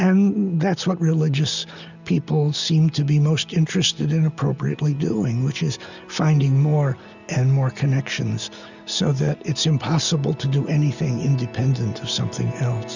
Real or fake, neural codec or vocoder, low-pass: real; none; 7.2 kHz